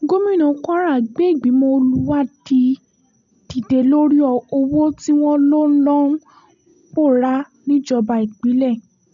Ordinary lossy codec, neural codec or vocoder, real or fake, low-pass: none; none; real; 7.2 kHz